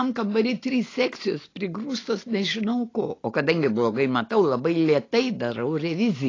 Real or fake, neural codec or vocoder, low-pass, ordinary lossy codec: real; none; 7.2 kHz; AAC, 32 kbps